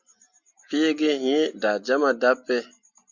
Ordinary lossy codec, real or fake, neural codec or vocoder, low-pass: Opus, 64 kbps; real; none; 7.2 kHz